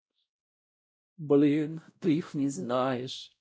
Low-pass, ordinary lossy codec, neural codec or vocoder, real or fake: none; none; codec, 16 kHz, 0.5 kbps, X-Codec, WavLM features, trained on Multilingual LibriSpeech; fake